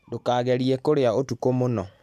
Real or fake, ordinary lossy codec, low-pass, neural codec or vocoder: real; MP3, 96 kbps; 14.4 kHz; none